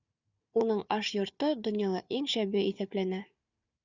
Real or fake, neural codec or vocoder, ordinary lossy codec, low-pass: fake; codec, 16 kHz, 4 kbps, FunCodec, trained on Chinese and English, 50 frames a second; Opus, 64 kbps; 7.2 kHz